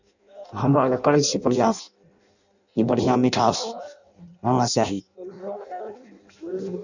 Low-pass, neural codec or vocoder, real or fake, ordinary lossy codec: 7.2 kHz; codec, 16 kHz in and 24 kHz out, 0.6 kbps, FireRedTTS-2 codec; fake; none